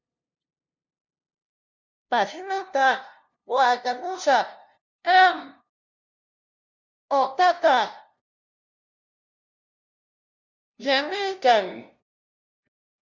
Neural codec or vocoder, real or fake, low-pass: codec, 16 kHz, 0.5 kbps, FunCodec, trained on LibriTTS, 25 frames a second; fake; 7.2 kHz